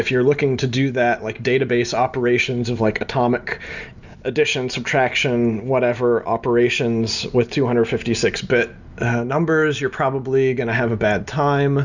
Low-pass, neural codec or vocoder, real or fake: 7.2 kHz; none; real